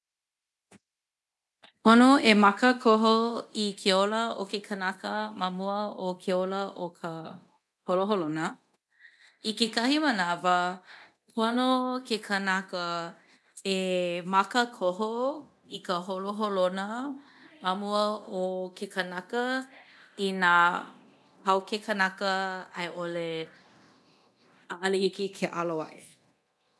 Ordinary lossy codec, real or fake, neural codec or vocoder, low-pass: none; fake; codec, 24 kHz, 0.9 kbps, DualCodec; none